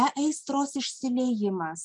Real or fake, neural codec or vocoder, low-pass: real; none; 10.8 kHz